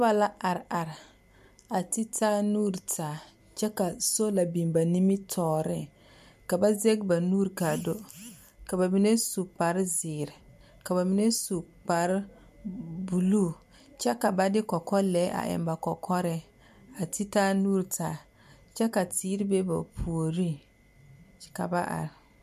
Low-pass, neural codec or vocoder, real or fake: 14.4 kHz; none; real